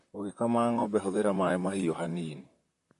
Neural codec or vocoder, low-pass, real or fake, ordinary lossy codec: vocoder, 44.1 kHz, 128 mel bands, Pupu-Vocoder; 14.4 kHz; fake; MP3, 48 kbps